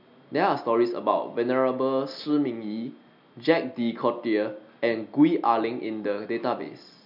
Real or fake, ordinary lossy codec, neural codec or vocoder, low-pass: real; none; none; 5.4 kHz